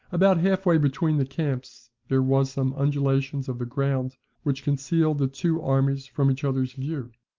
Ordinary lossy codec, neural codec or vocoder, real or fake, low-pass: Opus, 32 kbps; none; real; 7.2 kHz